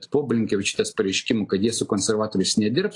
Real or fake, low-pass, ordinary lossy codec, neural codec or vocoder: real; 10.8 kHz; AAC, 48 kbps; none